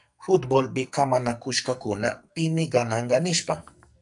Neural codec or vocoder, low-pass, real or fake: codec, 44.1 kHz, 2.6 kbps, SNAC; 10.8 kHz; fake